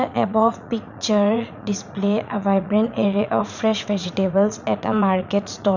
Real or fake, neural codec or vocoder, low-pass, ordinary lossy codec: fake; vocoder, 44.1 kHz, 80 mel bands, Vocos; 7.2 kHz; none